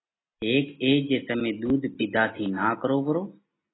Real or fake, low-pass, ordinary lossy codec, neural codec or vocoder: real; 7.2 kHz; AAC, 16 kbps; none